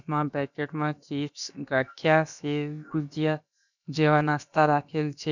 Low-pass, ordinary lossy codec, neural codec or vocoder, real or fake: 7.2 kHz; none; codec, 16 kHz, about 1 kbps, DyCAST, with the encoder's durations; fake